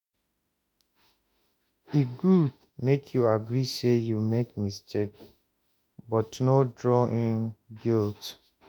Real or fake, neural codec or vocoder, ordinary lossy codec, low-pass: fake; autoencoder, 48 kHz, 32 numbers a frame, DAC-VAE, trained on Japanese speech; none; 19.8 kHz